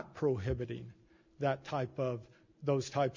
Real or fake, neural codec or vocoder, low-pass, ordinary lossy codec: real; none; 7.2 kHz; MP3, 64 kbps